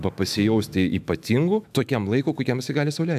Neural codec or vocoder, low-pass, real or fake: autoencoder, 48 kHz, 128 numbers a frame, DAC-VAE, trained on Japanese speech; 14.4 kHz; fake